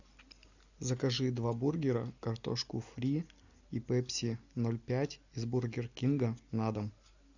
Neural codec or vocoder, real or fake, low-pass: none; real; 7.2 kHz